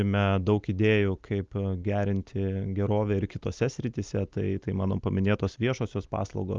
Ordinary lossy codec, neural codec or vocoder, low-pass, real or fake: Opus, 32 kbps; none; 7.2 kHz; real